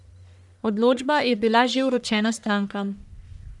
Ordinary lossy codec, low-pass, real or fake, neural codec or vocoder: none; 10.8 kHz; fake; codec, 44.1 kHz, 1.7 kbps, Pupu-Codec